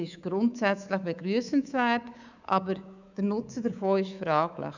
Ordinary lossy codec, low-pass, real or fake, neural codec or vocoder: none; 7.2 kHz; fake; codec, 24 kHz, 3.1 kbps, DualCodec